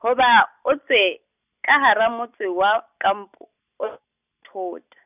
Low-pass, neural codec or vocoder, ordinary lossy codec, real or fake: 3.6 kHz; none; none; real